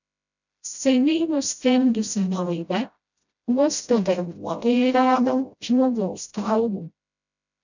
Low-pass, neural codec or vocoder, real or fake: 7.2 kHz; codec, 16 kHz, 0.5 kbps, FreqCodec, smaller model; fake